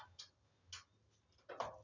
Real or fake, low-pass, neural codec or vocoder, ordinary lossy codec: real; 7.2 kHz; none; none